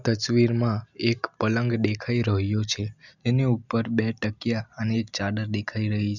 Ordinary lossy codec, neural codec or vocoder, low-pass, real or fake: none; none; 7.2 kHz; real